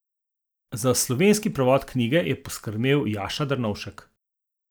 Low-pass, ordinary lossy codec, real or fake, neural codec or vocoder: none; none; real; none